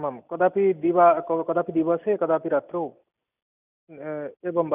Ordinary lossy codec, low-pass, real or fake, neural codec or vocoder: none; 3.6 kHz; real; none